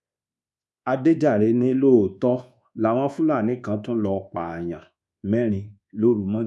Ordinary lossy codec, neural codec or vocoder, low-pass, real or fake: none; codec, 24 kHz, 1.2 kbps, DualCodec; none; fake